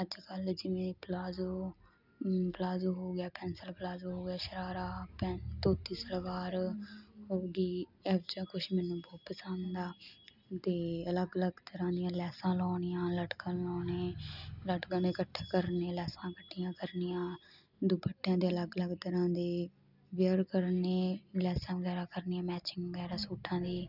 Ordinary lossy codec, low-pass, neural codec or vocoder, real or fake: none; 5.4 kHz; none; real